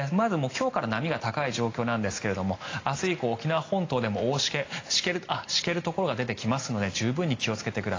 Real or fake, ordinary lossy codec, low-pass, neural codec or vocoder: real; AAC, 32 kbps; 7.2 kHz; none